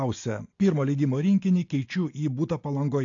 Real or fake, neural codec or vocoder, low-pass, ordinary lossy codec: real; none; 7.2 kHz; AAC, 48 kbps